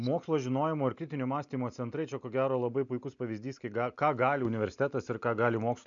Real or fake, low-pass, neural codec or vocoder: real; 7.2 kHz; none